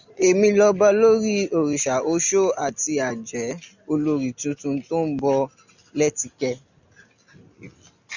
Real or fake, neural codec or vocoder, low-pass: real; none; 7.2 kHz